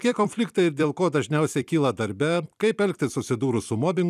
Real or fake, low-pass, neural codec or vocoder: fake; 14.4 kHz; vocoder, 44.1 kHz, 128 mel bands every 256 samples, BigVGAN v2